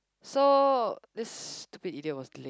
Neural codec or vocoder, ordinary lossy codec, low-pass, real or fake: none; none; none; real